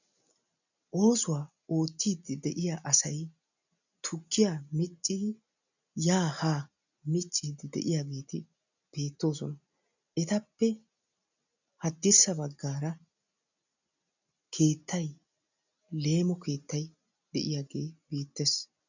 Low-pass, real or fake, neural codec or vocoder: 7.2 kHz; real; none